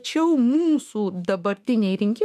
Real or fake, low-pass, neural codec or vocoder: fake; 14.4 kHz; autoencoder, 48 kHz, 32 numbers a frame, DAC-VAE, trained on Japanese speech